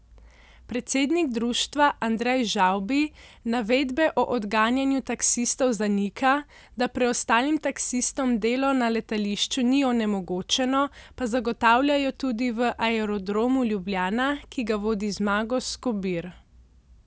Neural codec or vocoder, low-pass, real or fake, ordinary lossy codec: none; none; real; none